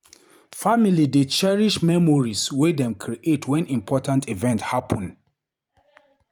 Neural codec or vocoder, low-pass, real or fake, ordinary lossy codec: vocoder, 44.1 kHz, 128 mel bands every 512 samples, BigVGAN v2; 19.8 kHz; fake; none